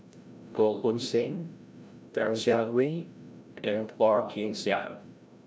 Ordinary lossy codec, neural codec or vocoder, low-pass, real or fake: none; codec, 16 kHz, 0.5 kbps, FreqCodec, larger model; none; fake